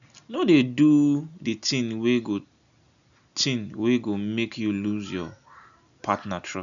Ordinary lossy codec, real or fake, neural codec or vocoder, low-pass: none; real; none; 7.2 kHz